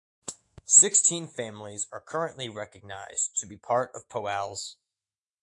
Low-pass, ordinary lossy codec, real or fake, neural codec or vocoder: 10.8 kHz; AAC, 64 kbps; fake; autoencoder, 48 kHz, 128 numbers a frame, DAC-VAE, trained on Japanese speech